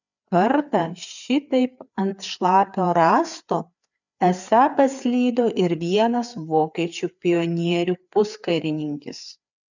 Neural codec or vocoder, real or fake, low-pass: codec, 16 kHz, 4 kbps, FreqCodec, larger model; fake; 7.2 kHz